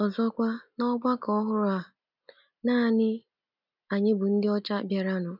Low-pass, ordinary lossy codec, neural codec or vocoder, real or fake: 5.4 kHz; none; none; real